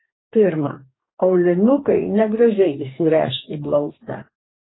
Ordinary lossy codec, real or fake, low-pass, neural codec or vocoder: AAC, 16 kbps; fake; 7.2 kHz; codec, 44.1 kHz, 2.6 kbps, DAC